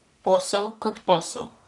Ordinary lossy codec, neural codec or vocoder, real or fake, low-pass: none; codec, 44.1 kHz, 1.7 kbps, Pupu-Codec; fake; 10.8 kHz